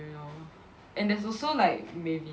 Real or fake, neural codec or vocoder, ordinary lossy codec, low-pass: real; none; none; none